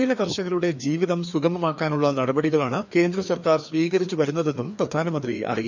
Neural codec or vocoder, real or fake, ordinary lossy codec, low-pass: codec, 16 kHz, 2 kbps, FreqCodec, larger model; fake; none; 7.2 kHz